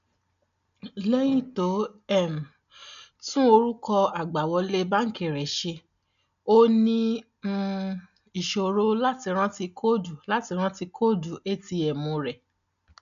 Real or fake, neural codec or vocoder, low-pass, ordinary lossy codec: real; none; 7.2 kHz; none